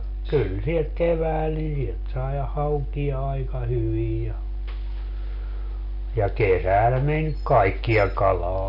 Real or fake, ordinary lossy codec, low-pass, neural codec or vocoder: real; none; 5.4 kHz; none